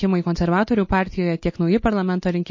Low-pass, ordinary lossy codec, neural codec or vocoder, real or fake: 7.2 kHz; MP3, 32 kbps; none; real